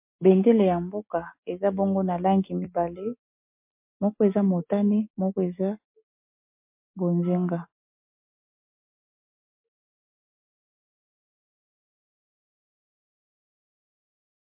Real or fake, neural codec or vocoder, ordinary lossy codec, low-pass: real; none; MP3, 32 kbps; 3.6 kHz